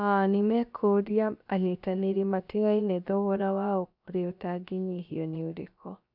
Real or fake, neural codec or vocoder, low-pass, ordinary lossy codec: fake; codec, 16 kHz, about 1 kbps, DyCAST, with the encoder's durations; 5.4 kHz; none